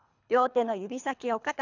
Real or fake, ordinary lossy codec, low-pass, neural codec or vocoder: fake; none; 7.2 kHz; codec, 24 kHz, 3 kbps, HILCodec